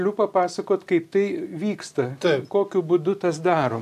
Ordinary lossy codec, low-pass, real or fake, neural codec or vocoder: AAC, 96 kbps; 14.4 kHz; real; none